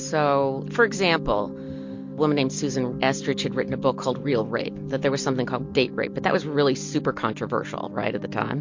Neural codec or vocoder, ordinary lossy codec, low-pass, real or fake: none; MP3, 48 kbps; 7.2 kHz; real